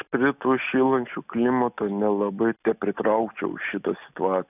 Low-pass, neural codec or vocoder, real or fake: 3.6 kHz; none; real